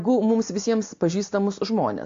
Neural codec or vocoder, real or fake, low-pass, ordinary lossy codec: none; real; 7.2 kHz; AAC, 48 kbps